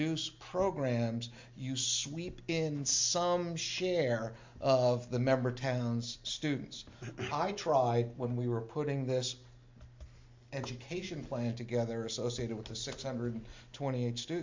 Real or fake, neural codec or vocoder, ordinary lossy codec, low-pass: real; none; MP3, 48 kbps; 7.2 kHz